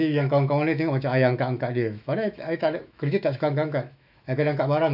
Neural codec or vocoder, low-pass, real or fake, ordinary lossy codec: autoencoder, 48 kHz, 128 numbers a frame, DAC-VAE, trained on Japanese speech; 5.4 kHz; fake; none